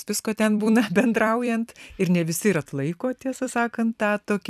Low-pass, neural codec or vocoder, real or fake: 14.4 kHz; vocoder, 44.1 kHz, 128 mel bands every 256 samples, BigVGAN v2; fake